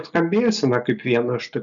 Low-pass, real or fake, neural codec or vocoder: 7.2 kHz; real; none